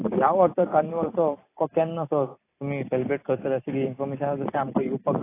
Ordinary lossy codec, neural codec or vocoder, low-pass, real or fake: AAC, 24 kbps; vocoder, 44.1 kHz, 128 mel bands every 256 samples, BigVGAN v2; 3.6 kHz; fake